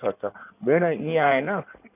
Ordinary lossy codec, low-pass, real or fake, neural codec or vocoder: none; 3.6 kHz; fake; codec, 16 kHz in and 24 kHz out, 2.2 kbps, FireRedTTS-2 codec